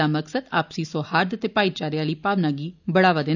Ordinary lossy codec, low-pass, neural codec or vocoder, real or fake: none; 7.2 kHz; none; real